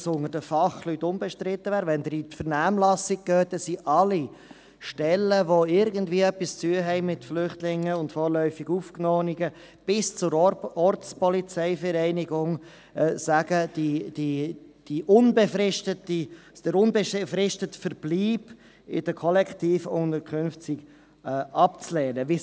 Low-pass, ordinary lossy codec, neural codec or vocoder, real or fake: none; none; none; real